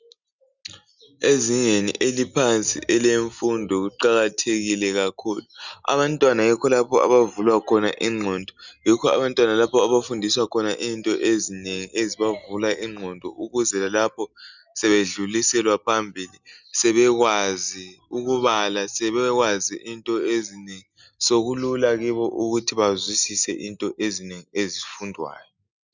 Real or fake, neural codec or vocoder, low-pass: real; none; 7.2 kHz